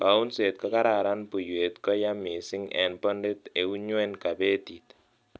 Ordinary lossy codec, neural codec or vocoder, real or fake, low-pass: none; none; real; none